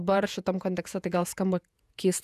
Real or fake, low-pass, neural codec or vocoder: fake; 14.4 kHz; vocoder, 48 kHz, 128 mel bands, Vocos